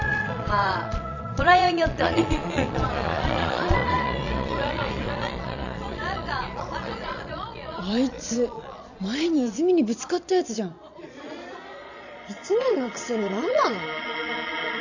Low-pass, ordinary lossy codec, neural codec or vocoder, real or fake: 7.2 kHz; none; vocoder, 22.05 kHz, 80 mel bands, Vocos; fake